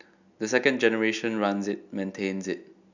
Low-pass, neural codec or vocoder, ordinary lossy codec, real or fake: 7.2 kHz; none; none; real